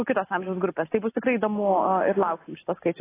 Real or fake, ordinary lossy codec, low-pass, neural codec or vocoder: real; AAC, 16 kbps; 3.6 kHz; none